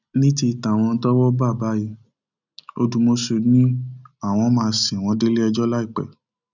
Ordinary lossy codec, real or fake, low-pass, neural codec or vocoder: none; real; 7.2 kHz; none